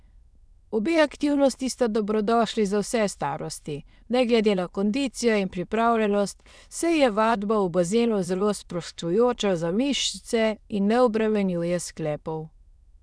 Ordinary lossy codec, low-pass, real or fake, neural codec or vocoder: none; none; fake; autoencoder, 22.05 kHz, a latent of 192 numbers a frame, VITS, trained on many speakers